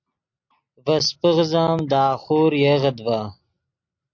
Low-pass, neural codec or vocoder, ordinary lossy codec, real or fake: 7.2 kHz; none; MP3, 48 kbps; real